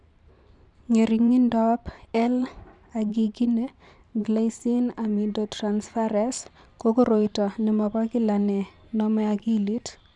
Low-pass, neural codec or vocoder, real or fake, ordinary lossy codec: 10.8 kHz; vocoder, 44.1 kHz, 128 mel bands every 512 samples, BigVGAN v2; fake; none